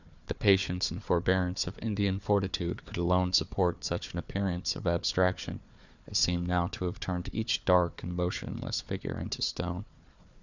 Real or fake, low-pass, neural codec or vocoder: fake; 7.2 kHz; codec, 16 kHz, 4 kbps, FunCodec, trained on Chinese and English, 50 frames a second